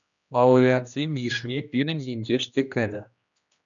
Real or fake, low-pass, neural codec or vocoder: fake; 7.2 kHz; codec, 16 kHz, 1 kbps, X-Codec, HuBERT features, trained on general audio